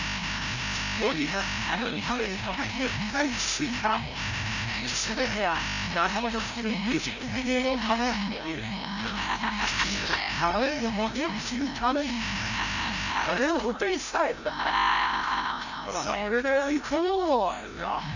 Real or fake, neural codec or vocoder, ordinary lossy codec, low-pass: fake; codec, 16 kHz, 0.5 kbps, FreqCodec, larger model; none; 7.2 kHz